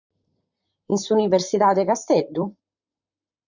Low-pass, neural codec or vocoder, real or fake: 7.2 kHz; vocoder, 44.1 kHz, 128 mel bands, Pupu-Vocoder; fake